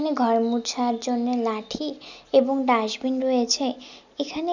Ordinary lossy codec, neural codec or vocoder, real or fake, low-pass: none; none; real; 7.2 kHz